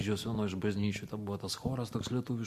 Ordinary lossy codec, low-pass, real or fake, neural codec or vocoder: MP3, 64 kbps; 14.4 kHz; real; none